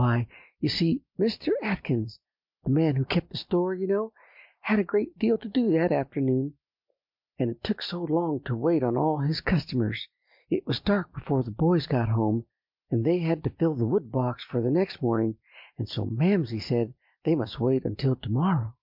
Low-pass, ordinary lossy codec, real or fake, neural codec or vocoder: 5.4 kHz; MP3, 48 kbps; real; none